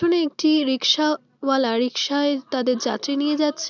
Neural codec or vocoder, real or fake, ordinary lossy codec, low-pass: none; real; none; 7.2 kHz